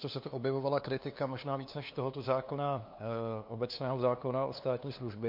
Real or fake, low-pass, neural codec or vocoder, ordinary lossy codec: fake; 5.4 kHz; codec, 16 kHz, 2 kbps, FunCodec, trained on LibriTTS, 25 frames a second; MP3, 32 kbps